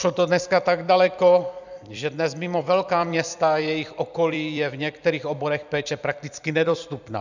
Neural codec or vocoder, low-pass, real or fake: vocoder, 44.1 kHz, 128 mel bands every 256 samples, BigVGAN v2; 7.2 kHz; fake